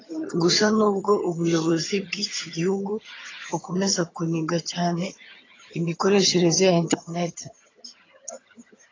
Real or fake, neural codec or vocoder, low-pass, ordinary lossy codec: fake; vocoder, 22.05 kHz, 80 mel bands, HiFi-GAN; 7.2 kHz; AAC, 32 kbps